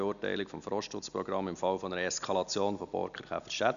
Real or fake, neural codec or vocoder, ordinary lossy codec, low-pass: real; none; none; 7.2 kHz